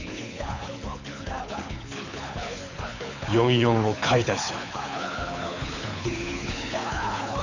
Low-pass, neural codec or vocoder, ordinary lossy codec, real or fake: 7.2 kHz; codec, 24 kHz, 6 kbps, HILCodec; none; fake